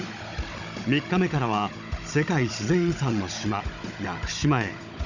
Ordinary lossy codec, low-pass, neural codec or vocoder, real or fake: none; 7.2 kHz; codec, 16 kHz, 16 kbps, FunCodec, trained on Chinese and English, 50 frames a second; fake